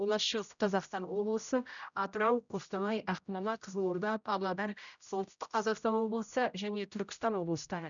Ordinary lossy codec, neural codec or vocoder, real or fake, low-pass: none; codec, 16 kHz, 0.5 kbps, X-Codec, HuBERT features, trained on general audio; fake; 7.2 kHz